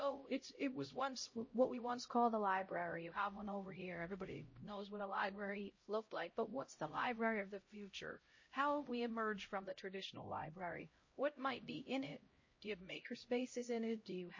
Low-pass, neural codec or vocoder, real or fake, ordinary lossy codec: 7.2 kHz; codec, 16 kHz, 0.5 kbps, X-Codec, HuBERT features, trained on LibriSpeech; fake; MP3, 32 kbps